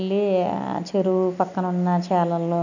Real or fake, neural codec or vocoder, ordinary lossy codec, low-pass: real; none; none; 7.2 kHz